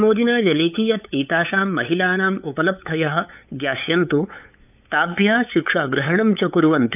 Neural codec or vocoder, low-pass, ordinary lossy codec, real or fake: codec, 16 kHz, 8 kbps, FunCodec, trained on LibriTTS, 25 frames a second; 3.6 kHz; none; fake